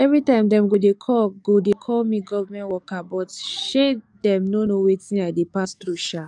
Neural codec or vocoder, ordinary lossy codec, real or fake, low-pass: codec, 44.1 kHz, 7.8 kbps, DAC; none; fake; 10.8 kHz